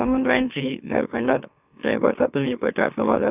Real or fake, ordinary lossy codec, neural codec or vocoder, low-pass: fake; none; autoencoder, 44.1 kHz, a latent of 192 numbers a frame, MeloTTS; 3.6 kHz